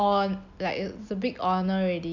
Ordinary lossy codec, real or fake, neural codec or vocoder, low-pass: none; real; none; 7.2 kHz